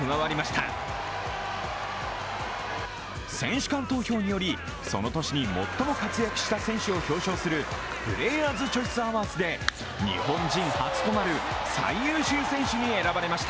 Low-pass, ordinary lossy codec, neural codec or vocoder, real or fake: none; none; none; real